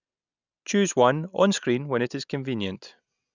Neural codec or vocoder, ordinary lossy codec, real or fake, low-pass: none; none; real; 7.2 kHz